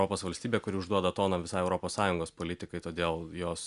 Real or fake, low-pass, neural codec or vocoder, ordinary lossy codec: real; 10.8 kHz; none; MP3, 96 kbps